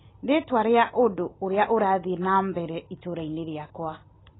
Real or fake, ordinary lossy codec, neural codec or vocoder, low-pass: real; AAC, 16 kbps; none; 7.2 kHz